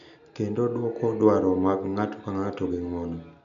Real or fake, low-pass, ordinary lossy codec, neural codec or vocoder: real; 7.2 kHz; none; none